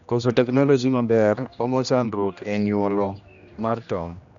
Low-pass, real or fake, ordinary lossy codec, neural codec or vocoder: 7.2 kHz; fake; none; codec, 16 kHz, 1 kbps, X-Codec, HuBERT features, trained on general audio